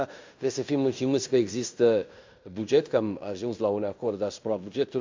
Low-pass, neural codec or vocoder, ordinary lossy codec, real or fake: 7.2 kHz; codec, 16 kHz in and 24 kHz out, 0.9 kbps, LongCat-Audio-Codec, fine tuned four codebook decoder; MP3, 64 kbps; fake